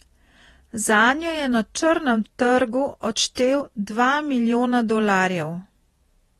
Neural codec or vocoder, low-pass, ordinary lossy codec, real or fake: vocoder, 48 kHz, 128 mel bands, Vocos; 19.8 kHz; AAC, 32 kbps; fake